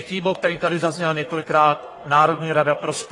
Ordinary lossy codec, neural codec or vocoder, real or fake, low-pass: AAC, 32 kbps; codec, 44.1 kHz, 1.7 kbps, Pupu-Codec; fake; 10.8 kHz